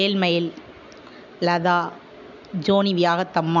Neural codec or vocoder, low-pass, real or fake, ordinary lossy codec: none; 7.2 kHz; real; none